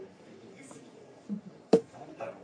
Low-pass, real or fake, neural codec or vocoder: 9.9 kHz; fake; codec, 44.1 kHz, 3.4 kbps, Pupu-Codec